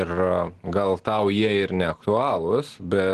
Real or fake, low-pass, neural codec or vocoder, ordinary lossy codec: real; 10.8 kHz; none; Opus, 16 kbps